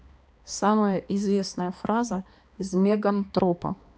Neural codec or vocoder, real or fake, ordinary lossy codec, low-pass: codec, 16 kHz, 2 kbps, X-Codec, HuBERT features, trained on balanced general audio; fake; none; none